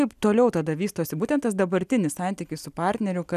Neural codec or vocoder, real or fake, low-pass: none; real; 14.4 kHz